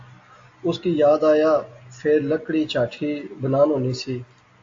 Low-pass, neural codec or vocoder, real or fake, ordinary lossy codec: 7.2 kHz; none; real; AAC, 48 kbps